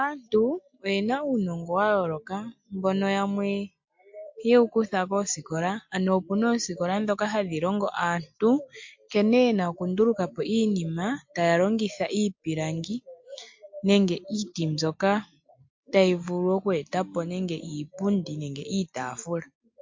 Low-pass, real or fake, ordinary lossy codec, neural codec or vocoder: 7.2 kHz; real; MP3, 48 kbps; none